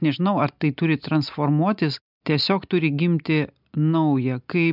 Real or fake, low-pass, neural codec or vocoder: real; 5.4 kHz; none